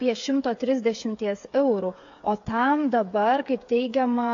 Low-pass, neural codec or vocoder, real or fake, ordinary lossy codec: 7.2 kHz; codec, 16 kHz, 8 kbps, FreqCodec, smaller model; fake; AAC, 48 kbps